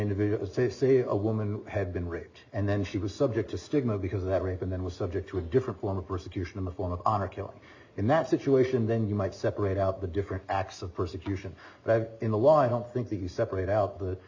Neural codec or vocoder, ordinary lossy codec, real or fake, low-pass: none; AAC, 48 kbps; real; 7.2 kHz